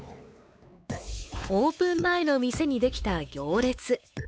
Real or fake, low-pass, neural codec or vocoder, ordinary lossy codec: fake; none; codec, 16 kHz, 4 kbps, X-Codec, WavLM features, trained on Multilingual LibriSpeech; none